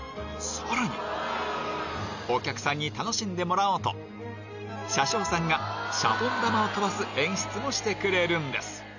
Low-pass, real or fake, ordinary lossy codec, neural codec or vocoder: 7.2 kHz; real; none; none